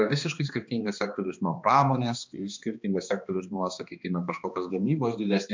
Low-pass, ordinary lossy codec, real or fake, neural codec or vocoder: 7.2 kHz; MP3, 64 kbps; fake; codec, 16 kHz, 2 kbps, X-Codec, HuBERT features, trained on balanced general audio